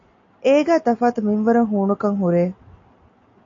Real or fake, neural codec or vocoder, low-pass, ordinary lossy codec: real; none; 7.2 kHz; AAC, 48 kbps